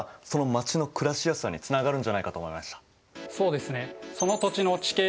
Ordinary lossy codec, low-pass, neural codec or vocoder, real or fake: none; none; none; real